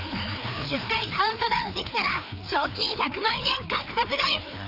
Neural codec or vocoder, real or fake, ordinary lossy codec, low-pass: codec, 16 kHz, 2 kbps, FreqCodec, larger model; fake; none; 5.4 kHz